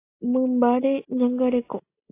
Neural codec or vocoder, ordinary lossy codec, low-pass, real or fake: none; AAC, 24 kbps; 3.6 kHz; real